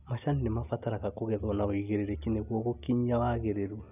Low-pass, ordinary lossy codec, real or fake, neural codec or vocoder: 3.6 kHz; none; real; none